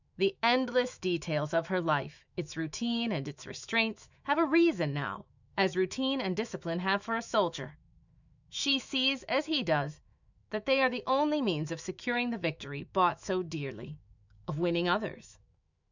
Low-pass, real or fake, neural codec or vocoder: 7.2 kHz; fake; autoencoder, 48 kHz, 128 numbers a frame, DAC-VAE, trained on Japanese speech